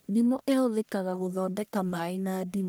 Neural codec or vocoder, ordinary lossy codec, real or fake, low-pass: codec, 44.1 kHz, 1.7 kbps, Pupu-Codec; none; fake; none